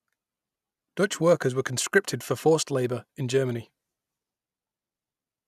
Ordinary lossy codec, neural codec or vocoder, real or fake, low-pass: none; none; real; 14.4 kHz